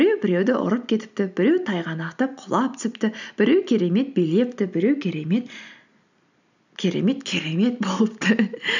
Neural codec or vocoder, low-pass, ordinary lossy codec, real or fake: none; 7.2 kHz; none; real